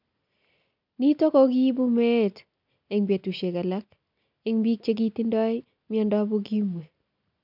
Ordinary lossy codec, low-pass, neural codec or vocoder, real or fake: none; 5.4 kHz; none; real